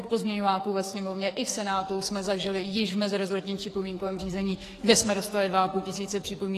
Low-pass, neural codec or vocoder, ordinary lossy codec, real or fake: 14.4 kHz; codec, 44.1 kHz, 2.6 kbps, SNAC; AAC, 48 kbps; fake